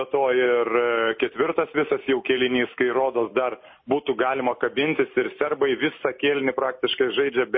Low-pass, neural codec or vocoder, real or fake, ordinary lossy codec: 7.2 kHz; none; real; MP3, 24 kbps